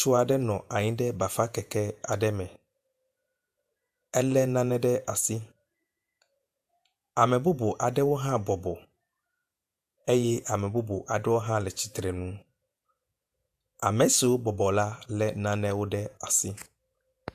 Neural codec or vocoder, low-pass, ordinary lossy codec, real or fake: vocoder, 48 kHz, 128 mel bands, Vocos; 14.4 kHz; AAC, 96 kbps; fake